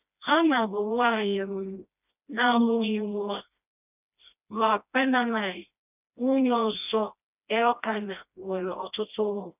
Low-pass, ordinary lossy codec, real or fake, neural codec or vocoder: 3.6 kHz; none; fake; codec, 16 kHz, 1 kbps, FreqCodec, smaller model